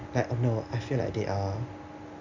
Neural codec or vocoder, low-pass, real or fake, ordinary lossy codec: none; 7.2 kHz; real; MP3, 64 kbps